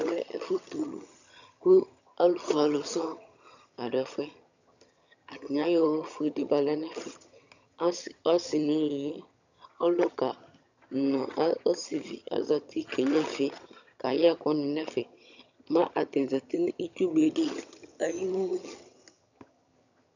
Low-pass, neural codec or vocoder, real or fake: 7.2 kHz; vocoder, 22.05 kHz, 80 mel bands, HiFi-GAN; fake